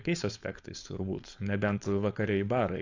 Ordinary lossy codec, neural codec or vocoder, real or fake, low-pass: AAC, 48 kbps; codec, 16 kHz, 4.8 kbps, FACodec; fake; 7.2 kHz